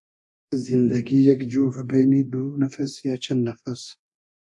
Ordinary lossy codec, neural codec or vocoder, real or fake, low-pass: AAC, 64 kbps; codec, 24 kHz, 0.9 kbps, DualCodec; fake; 10.8 kHz